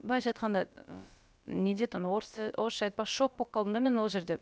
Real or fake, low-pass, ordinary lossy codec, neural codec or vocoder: fake; none; none; codec, 16 kHz, about 1 kbps, DyCAST, with the encoder's durations